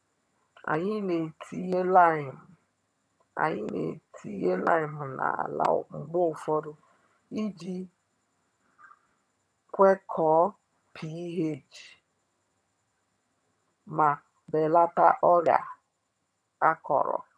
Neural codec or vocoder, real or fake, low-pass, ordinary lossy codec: vocoder, 22.05 kHz, 80 mel bands, HiFi-GAN; fake; none; none